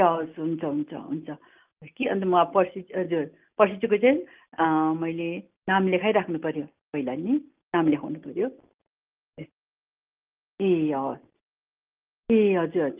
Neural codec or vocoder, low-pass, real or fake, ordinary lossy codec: none; 3.6 kHz; real; Opus, 32 kbps